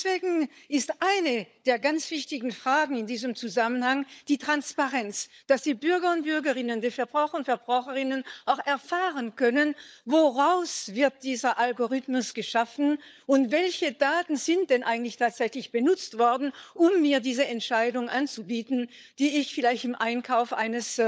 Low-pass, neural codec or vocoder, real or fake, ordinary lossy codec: none; codec, 16 kHz, 16 kbps, FunCodec, trained on LibriTTS, 50 frames a second; fake; none